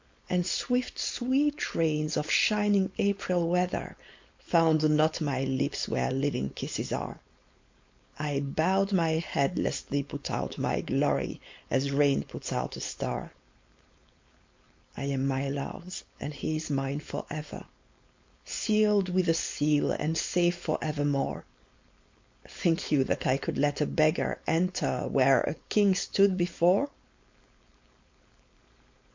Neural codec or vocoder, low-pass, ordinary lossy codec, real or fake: codec, 16 kHz, 4.8 kbps, FACodec; 7.2 kHz; MP3, 48 kbps; fake